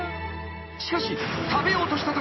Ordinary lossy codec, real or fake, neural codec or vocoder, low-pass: MP3, 24 kbps; real; none; 7.2 kHz